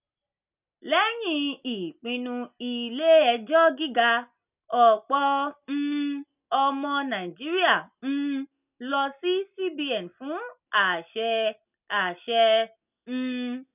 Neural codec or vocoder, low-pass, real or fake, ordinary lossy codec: none; 3.6 kHz; real; none